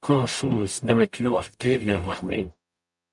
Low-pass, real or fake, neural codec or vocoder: 10.8 kHz; fake; codec, 44.1 kHz, 0.9 kbps, DAC